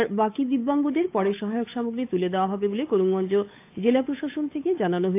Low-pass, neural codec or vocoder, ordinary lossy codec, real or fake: 3.6 kHz; codec, 16 kHz, 8 kbps, FunCodec, trained on Chinese and English, 25 frames a second; AAC, 24 kbps; fake